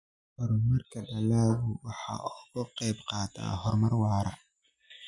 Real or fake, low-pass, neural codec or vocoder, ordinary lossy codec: real; 10.8 kHz; none; none